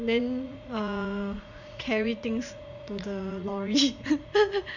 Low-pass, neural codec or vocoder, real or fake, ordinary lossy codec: 7.2 kHz; vocoder, 44.1 kHz, 80 mel bands, Vocos; fake; none